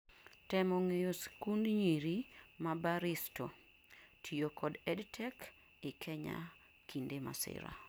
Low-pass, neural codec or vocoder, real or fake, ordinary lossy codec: none; none; real; none